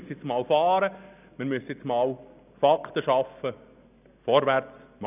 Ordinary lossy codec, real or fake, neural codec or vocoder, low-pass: none; real; none; 3.6 kHz